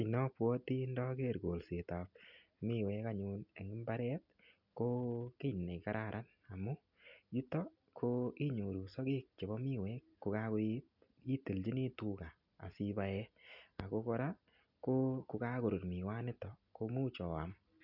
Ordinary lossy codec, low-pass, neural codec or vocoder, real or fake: none; 5.4 kHz; none; real